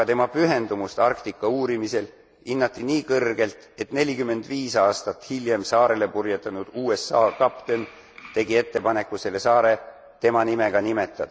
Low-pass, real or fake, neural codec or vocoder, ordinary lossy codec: none; real; none; none